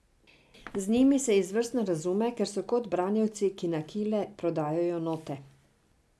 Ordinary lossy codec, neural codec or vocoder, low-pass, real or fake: none; none; none; real